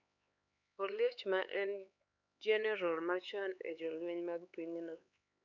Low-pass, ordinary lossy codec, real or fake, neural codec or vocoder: 7.2 kHz; none; fake; codec, 16 kHz, 4 kbps, X-Codec, HuBERT features, trained on LibriSpeech